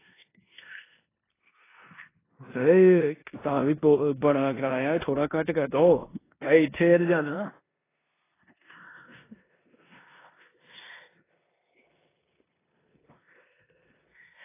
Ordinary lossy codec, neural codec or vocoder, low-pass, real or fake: AAC, 16 kbps; codec, 16 kHz in and 24 kHz out, 0.9 kbps, LongCat-Audio-Codec, four codebook decoder; 3.6 kHz; fake